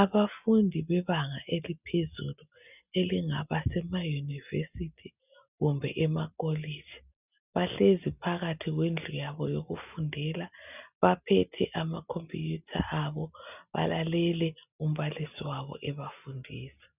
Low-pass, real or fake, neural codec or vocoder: 3.6 kHz; real; none